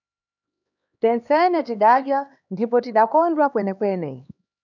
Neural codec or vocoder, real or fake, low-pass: codec, 16 kHz, 2 kbps, X-Codec, HuBERT features, trained on LibriSpeech; fake; 7.2 kHz